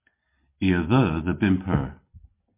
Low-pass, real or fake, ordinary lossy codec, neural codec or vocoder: 3.6 kHz; real; MP3, 24 kbps; none